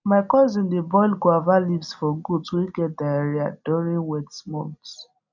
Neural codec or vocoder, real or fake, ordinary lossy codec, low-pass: none; real; none; 7.2 kHz